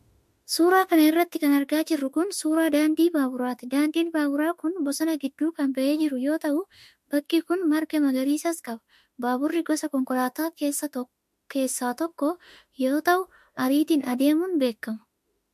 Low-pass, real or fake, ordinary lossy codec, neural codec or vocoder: 14.4 kHz; fake; MP3, 64 kbps; autoencoder, 48 kHz, 32 numbers a frame, DAC-VAE, trained on Japanese speech